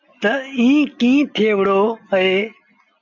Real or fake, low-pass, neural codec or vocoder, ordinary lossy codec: real; 7.2 kHz; none; MP3, 64 kbps